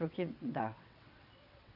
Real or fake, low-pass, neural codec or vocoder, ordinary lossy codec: fake; 5.4 kHz; vocoder, 22.05 kHz, 80 mel bands, WaveNeXt; none